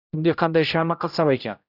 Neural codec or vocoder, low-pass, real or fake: codec, 16 kHz, 0.5 kbps, X-Codec, HuBERT features, trained on general audio; 5.4 kHz; fake